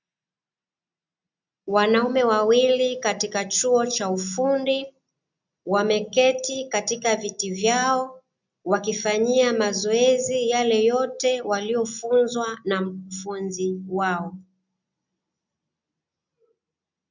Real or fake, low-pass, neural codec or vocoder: real; 7.2 kHz; none